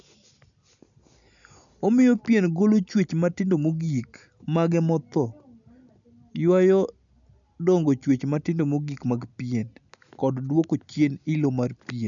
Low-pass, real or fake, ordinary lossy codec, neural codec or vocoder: 7.2 kHz; real; none; none